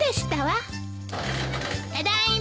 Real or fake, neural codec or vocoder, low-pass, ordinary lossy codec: real; none; none; none